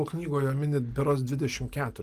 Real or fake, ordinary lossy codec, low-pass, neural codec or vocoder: real; Opus, 32 kbps; 14.4 kHz; none